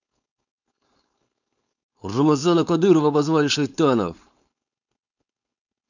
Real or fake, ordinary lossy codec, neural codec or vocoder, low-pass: fake; none; codec, 16 kHz, 4.8 kbps, FACodec; 7.2 kHz